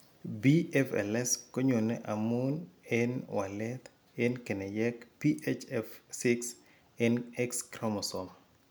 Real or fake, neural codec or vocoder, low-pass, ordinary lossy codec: real; none; none; none